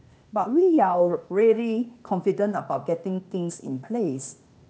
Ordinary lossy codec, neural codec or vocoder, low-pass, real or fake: none; codec, 16 kHz, 0.8 kbps, ZipCodec; none; fake